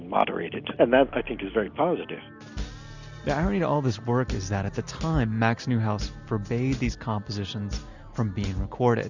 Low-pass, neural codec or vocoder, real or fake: 7.2 kHz; none; real